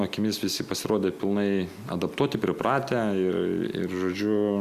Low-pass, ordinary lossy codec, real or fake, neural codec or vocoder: 14.4 kHz; Opus, 64 kbps; real; none